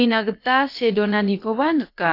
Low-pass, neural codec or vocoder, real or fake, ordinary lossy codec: 5.4 kHz; codec, 16 kHz, about 1 kbps, DyCAST, with the encoder's durations; fake; AAC, 32 kbps